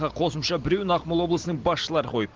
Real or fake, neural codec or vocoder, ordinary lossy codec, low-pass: real; none; Opus, 16 kbps; 7.2 kHz